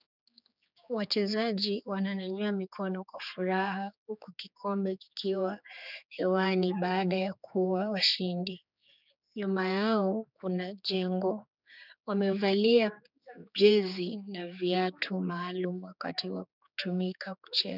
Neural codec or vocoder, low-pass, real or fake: codec, 16 kHz, 4 kbps, X-Codec, HuBERT features, trained on general audio; 5.4 kHz; fake